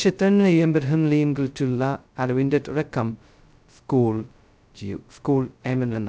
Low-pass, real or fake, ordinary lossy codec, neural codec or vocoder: none; fake; none; codec, 16 kHz, 0.2 kbps, FocalCodec